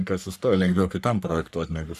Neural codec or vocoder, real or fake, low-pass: codec, 44.1 kHz, 3.4 kbps, Pupu-Codec; fake; 14.4 kHz